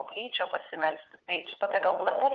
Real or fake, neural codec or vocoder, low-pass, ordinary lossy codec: fake; codec, 16 kHz, 4 kbps, FunCodec, trained on LibriTTS, 50 frames a second; 7.2 kHz; Opus, 24 kbps